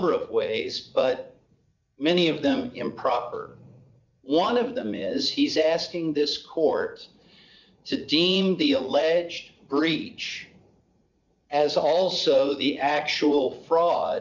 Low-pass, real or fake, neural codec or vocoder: 7.2 kHz; fake; vocoder, 44.1 kHz, 80 mel bands, Vocos